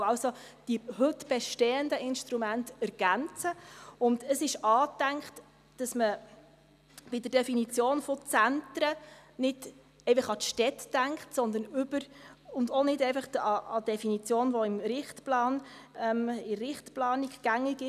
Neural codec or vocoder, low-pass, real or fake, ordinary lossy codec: none; 14.4 kHz; real; none